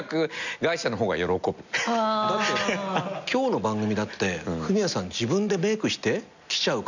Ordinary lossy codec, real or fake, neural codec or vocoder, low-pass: none; real; none; 7.2 kHz